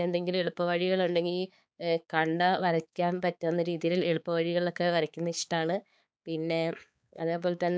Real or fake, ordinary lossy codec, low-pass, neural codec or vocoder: fake; none; none; codec, 16 kHz, 4 kbps, X-Codec, HuBERT features, trained on balanced general audio